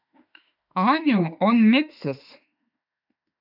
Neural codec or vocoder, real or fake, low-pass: autoencoder, 48 kHz, 32 numbers a frame, DAC-VAE, trained on Japanese speech; fake; 5.4 kHz